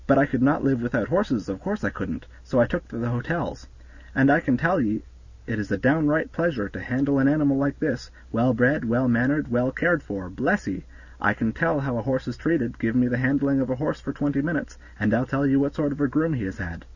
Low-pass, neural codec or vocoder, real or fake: 7.2 kHz; none; real